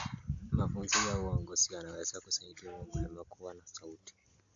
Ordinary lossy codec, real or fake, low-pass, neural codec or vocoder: AAC, 64 kbps; real; 7.2 kHz; none